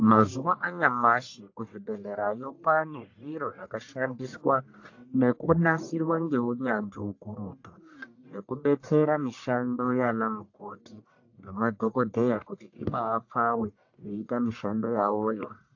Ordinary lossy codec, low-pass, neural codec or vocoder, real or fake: AAC, 48 kbps; 7.2 kHz; codec, 44.1 kHz, 1.7 kbps, Pupu-Codec; fake